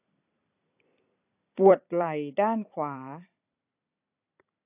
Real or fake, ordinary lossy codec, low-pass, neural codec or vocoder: fake; AAC, 32 kbps; 3.6 kHz; vocoder, 44.1 kHz, 128 mel bands every 256 samples, BigVGAN v2